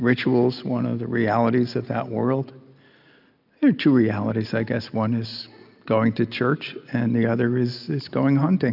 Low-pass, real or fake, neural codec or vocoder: 5.4 kHz; real; none